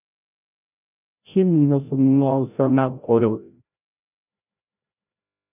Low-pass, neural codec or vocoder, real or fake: 3.6 kHz; codec, 16 kHz, 0.5 kbps, FreqCodec, larger model; fake